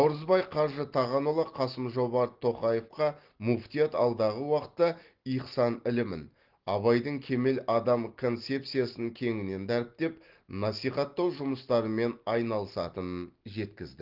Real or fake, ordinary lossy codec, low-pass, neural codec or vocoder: real; Opus, 16 kbps; 5.4 kHz; none